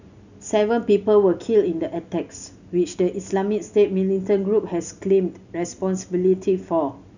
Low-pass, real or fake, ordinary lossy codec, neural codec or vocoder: 7.2 kHz; real; none; none